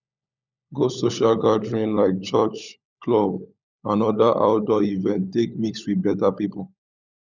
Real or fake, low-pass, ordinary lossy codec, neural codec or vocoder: fake; 7.2 kHz; none; codec, 16 kHz, 16 kbps, FunCodec, trained on LibriTTS, 50 frames a second